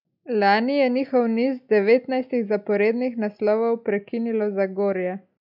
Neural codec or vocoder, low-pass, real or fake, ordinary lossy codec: none; 5.4 kHz; real; none